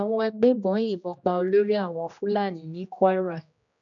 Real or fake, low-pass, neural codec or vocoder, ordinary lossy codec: fake; 7.2 kHz; codec, 16 kHz, 1 kbps, X-Codec, HuBERT features, trained on general audio; none